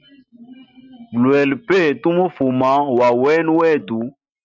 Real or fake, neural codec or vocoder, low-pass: real; none; 7.2 kHz